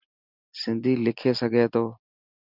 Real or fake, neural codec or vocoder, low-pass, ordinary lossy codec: real; none; 5.4 kHz; Opus, 64 kbps